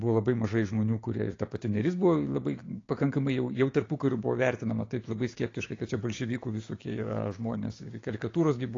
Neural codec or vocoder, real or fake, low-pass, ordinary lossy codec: codec, 16 kHz, 6 kbps, DAC; fake; 7.2 kHz; AAC, 32 kbps